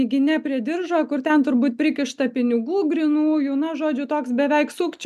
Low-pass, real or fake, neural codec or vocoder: 14.4 kHz; real; none